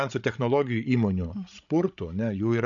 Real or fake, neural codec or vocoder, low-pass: fake; codec, 16 kHz, 16 kbps, FreqCodec, larger model; 7.2 kHz